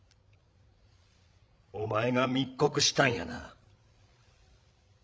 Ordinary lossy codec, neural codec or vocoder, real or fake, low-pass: none; codec, 16 kHz, 16 kbps, FreqCodec, larger model; fake; none